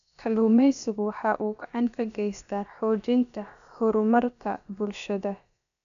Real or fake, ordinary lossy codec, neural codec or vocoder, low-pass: fake; none; codec, 16 kHz, about 1 kbps, DyCAST, with the encoder's durations; 7.2 kHz